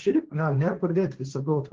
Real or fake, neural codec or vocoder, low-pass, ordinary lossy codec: fake; codec, 16 kHz, 1.1 kbps, Voila-Tokenizer; 7.2 kHz; Opus, 16 kbps